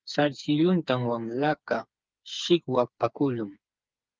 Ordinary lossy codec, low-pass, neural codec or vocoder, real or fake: Opus, 24 kbps; 7.2 kHz; codec, 16 kHz, 4 kbps, FreqCodec, smaller model; fake